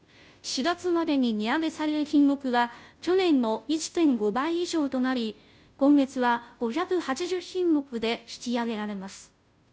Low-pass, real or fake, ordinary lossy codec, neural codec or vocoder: none; fake; none; codec, 16 kHz, 0.5 kbps, FunCodec, trained on Chinese and English, 25 frames a second